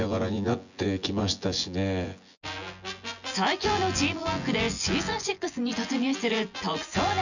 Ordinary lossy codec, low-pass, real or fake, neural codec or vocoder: none; 7.2 kHz; fake; vocoder, 24 kHz, 100 mel bands, Vocos